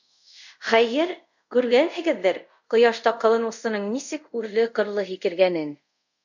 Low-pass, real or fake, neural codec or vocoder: 7.2 kHz; fake; codec, 24 kHz, 0.5 kbps, DualCodec